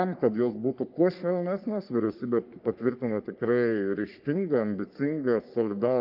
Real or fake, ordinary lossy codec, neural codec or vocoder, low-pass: fake; Opus, 24 kbps; codec, 44.1 kHz, 3.4 kbps, Pupu-Codec; 5.4 kHz